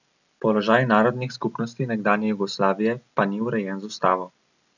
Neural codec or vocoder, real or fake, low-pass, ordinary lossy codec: none; real; 7.2 kHz; none